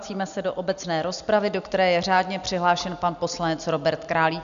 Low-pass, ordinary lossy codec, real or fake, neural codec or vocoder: 7.2 kHz; MP3, 96 kbps; real; none